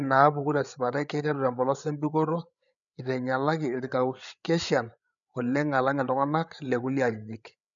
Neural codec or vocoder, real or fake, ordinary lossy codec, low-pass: codec, 16 kHz, 8 kbps, FreqCodec, larger model; fake; none; 7.2 kHz